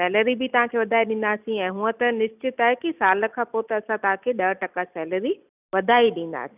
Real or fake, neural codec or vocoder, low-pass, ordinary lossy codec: real; none; 3.6 kHz; none